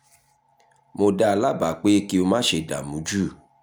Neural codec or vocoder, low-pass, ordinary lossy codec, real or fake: none; none; none; real